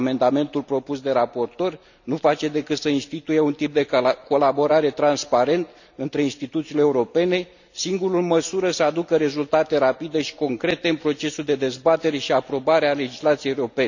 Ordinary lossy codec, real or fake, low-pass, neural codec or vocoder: none; real; 7.2 kHz; none